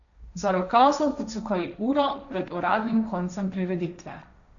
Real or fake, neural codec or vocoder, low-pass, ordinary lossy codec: fake; codec, 16 kHz, 1.1 kbps, Voila-Tokenizer; 7.2 kHz; none